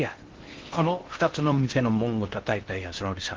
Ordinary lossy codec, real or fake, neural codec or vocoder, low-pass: Opus, 16 kbps; fake; codec, 16 kHz in and 24 kHz out, 0.6 kbps, FocalCodec, streaming, 4096 codes; 7.2 kHz